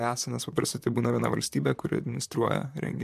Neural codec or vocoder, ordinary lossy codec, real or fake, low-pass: vocoder, 44.1 kHz, 128 mel bands, Pupu-Vocoder; MP3, 96 kbps; fake; 14.4 kHz